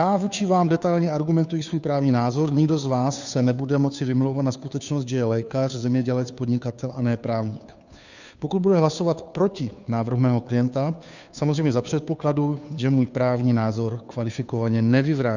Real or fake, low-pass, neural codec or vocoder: fake; 7.2 kHz; codec, 16 kHz, 2 kbps, FunCodec, trained on Chinese and English, 25 frames a second